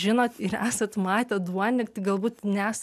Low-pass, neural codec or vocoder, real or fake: 14.4 kHz; none; real